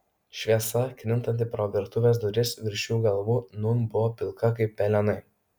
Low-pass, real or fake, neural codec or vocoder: 19.8 kHz; real; none